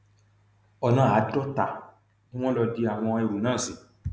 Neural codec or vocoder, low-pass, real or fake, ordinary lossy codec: none; none; real; none